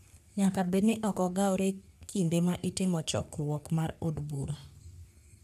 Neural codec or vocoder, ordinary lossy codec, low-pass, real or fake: codec, 44.1 kHz, 3.4 kbps, Pupu-Codec; none; 14.4 kHz; fake